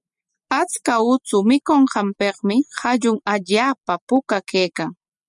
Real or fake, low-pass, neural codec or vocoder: real; 10.8 kHz; none